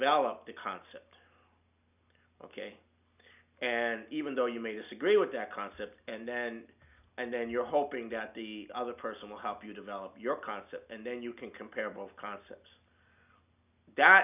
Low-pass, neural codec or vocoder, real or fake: 3.6 kHz; none; real